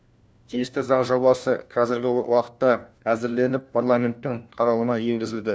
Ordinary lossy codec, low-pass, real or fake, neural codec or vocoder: none; none; fake; codec, 16 kHz, 1 kbps, FunCodec, trained on LibriTTS, 50 frames a second